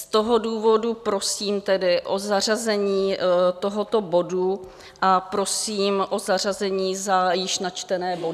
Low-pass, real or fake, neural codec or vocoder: 14.4 kHz; real; none